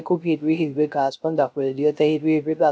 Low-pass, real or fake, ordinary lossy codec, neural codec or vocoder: none; fake; none; codec, 16 kHz, 0.3 kbps, FocalCodec